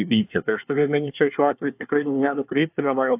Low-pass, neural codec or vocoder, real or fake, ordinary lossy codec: 3.6 kHz; codec, 24 kHz, 1 kbps, SNAC; fake; AAC, 32 kbps